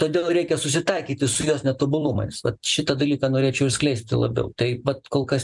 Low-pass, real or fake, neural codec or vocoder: 10.8 kHz; fake; vocoder, 44.1 kHz, 128 mel bands every 256 samples, BigVGAN v2